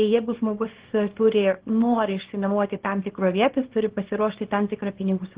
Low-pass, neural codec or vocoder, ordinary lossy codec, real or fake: 3.6 kHz; codec, 24 kHz, 0.9 kbps, WavTokenizer, small release; Opus, 16 kbps; fake